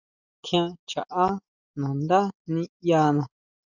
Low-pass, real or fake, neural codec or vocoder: 7.2 kHz; real; none